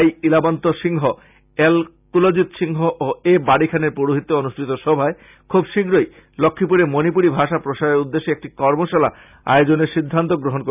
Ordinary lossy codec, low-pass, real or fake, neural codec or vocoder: none; 3.6 kHz; real; none